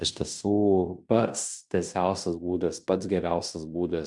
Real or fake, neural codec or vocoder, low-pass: fake; codec, 16 kHz in and 24 kHz out, 0.9 kbps, LongCat-Audio-Codec, fine tuned four codebook decoder; 10.8 kHz